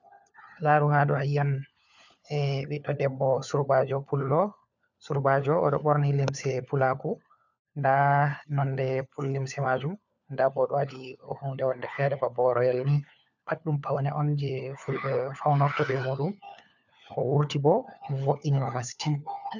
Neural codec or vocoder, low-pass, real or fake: codec, 16 kHz, 4 kbps, FunCodec, trained on LibriTTS, 50 frames a second; 7.2 kHz; fake